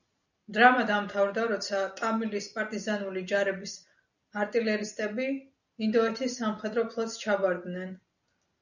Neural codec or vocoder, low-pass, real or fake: none; 7.2 kHz; real